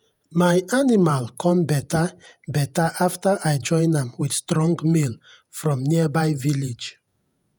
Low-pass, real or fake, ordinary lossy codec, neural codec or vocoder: none; fake; none; vocoder, 48 kHz, 128 mel bands, Vocos